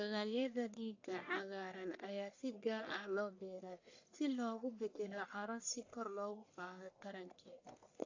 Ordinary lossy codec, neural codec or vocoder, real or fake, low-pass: AAC, 48 kbps; codec, 44.1 kHz, 1.7 kbps, Pupu-Codec; fake; 7.2 kHz